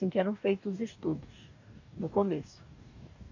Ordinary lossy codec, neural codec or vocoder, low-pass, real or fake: none; codec, 44.1 kHz, 2.6 kbps, DAC; 7.2 kHz; fake